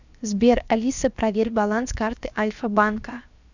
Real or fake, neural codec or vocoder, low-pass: fake; codec, 16 kHz, 0.7 kbps, FocalCodec; 7.2 kHz